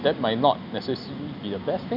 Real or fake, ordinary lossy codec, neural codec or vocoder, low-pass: real; none; none; 5.4 kHz